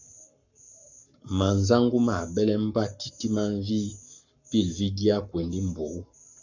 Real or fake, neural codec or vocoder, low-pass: fake; codec, 44.1 kHz, 7.8 kbps, Pupu-Codec; 7.2 kHz